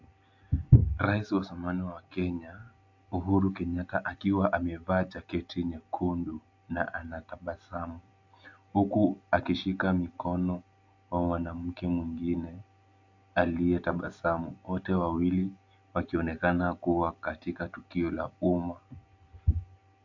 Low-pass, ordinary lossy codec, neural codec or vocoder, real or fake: 7.2 kHz; AAC, 48 kbps; none; real